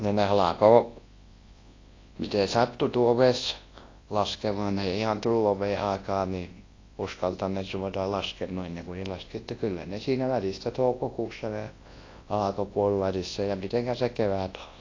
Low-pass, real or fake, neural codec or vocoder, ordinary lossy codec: 7.2 kHz; fake; codec, 24 kHz, 0.9 kbps, WavTokenizer, large speech release; AAC, 32 kbps